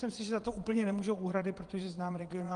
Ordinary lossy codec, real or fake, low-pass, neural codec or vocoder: Opus, 24 kbps; fake; 9.9 kHz; vocoder, 22.05 kHz, 80 mel bands, Vocos